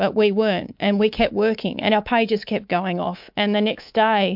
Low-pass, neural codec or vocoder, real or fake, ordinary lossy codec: 5.4 kHz; codec, 24 kHz, 3.1 kbps, DualCodec; fake; MP3, 48 kbps